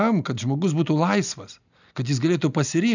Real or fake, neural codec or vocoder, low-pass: real; none; 7.2 kHz